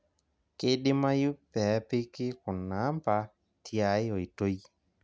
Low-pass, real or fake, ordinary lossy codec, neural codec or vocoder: none; real; none; none